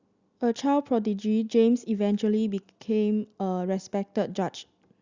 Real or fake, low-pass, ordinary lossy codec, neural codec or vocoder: real; 7.2 kHz; Opus, 64 kbps; none